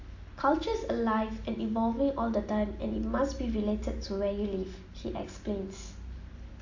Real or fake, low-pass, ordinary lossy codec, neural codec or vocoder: real; 7.2 kHz; AAC, 48 kbps; none